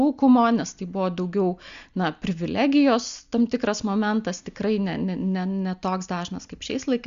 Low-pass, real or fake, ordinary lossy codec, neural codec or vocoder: 7.2 kHz; real; Opus, 64 kbps; none